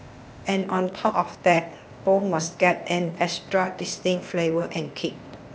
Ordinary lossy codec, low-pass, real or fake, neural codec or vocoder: none; none; fake; codec, 16 kHz, 0.8 kbps, ZipCodec